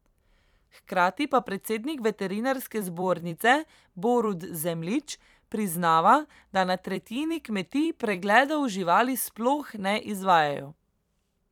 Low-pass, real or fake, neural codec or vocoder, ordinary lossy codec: 19.8 kHz; fake; vocoder, 44.1 kHz, 128 mel bands every 256 samples, BigVGAN v2; none